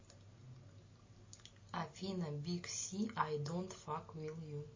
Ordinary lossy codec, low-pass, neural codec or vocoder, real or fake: MP3, 32 kbps; 7.2 kHz; none; real